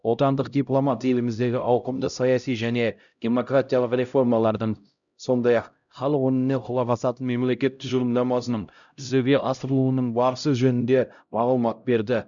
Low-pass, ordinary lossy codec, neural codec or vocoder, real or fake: 7.2 kHz; none; codec, 16 kHz, 0.5 kbps, X-Codec, HuBERT features, trained on LibriSpeech; fake